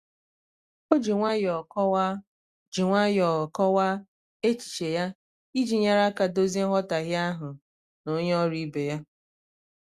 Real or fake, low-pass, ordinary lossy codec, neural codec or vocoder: real; 14.4 kHz; Opus, 64 kbps; none